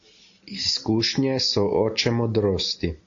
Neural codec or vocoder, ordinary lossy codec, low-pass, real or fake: none; AAC, 48 kbps; 7.2 kHz; real